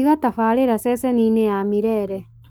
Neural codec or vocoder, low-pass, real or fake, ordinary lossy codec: codec, 44.1 kHz, 7.8 kbps, Pupu-Codec; none; fake; none